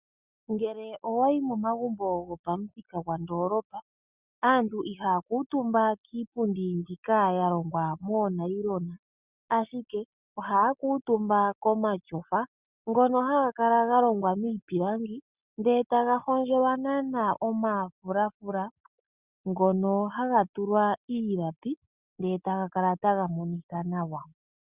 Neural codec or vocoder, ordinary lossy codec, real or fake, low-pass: none; Opus, 64 kbps; real; 3.6 kHz